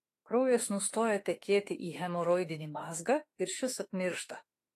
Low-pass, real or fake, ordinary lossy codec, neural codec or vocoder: 14.4 kHz; fake; AAC, 48 kbps; autoencoder, 48 kHz, 32 numbers a frame, DAC-VAE, trained on Japanese speech